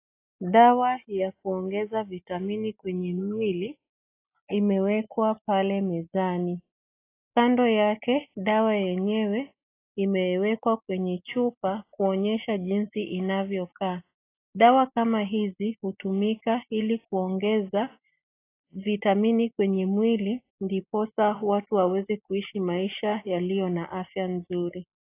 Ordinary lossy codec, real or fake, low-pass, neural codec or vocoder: AAC, 24 kbps; real; 3.6 kHz; none